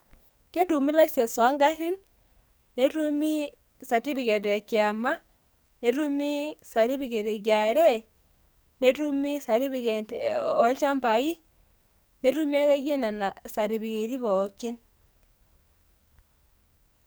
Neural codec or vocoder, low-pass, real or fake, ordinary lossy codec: codec, 44.1 kHz, 2.6 kbps, SNAC; none; fake; none